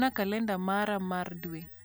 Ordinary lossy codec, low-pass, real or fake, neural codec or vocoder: none; none; real; none